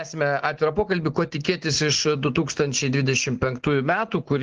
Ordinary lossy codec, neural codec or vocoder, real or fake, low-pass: Opus, 16 kbps; none; real; 7.2 kHz